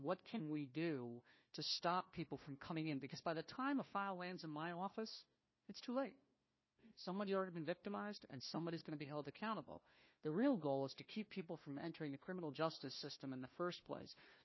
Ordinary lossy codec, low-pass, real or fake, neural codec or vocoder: MP3, 24 kbps; 7.2 kHz; fake; codec, 16 kHz, 1 kbps, FunCodec, trained on Chinese and English, 50 frames a second